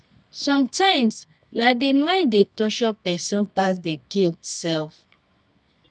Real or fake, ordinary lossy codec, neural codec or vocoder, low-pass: fake; none; codec, 24 kHz, 0.9 kbps, WavTokenizer, medium music audio release; 10.8 kHz